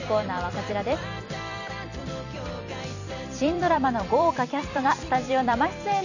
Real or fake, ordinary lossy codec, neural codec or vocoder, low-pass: fake; none; vocoder, 44.1 kHz, 128 mel bands every 256 samples, BigVGAN v2; 7.2 kHz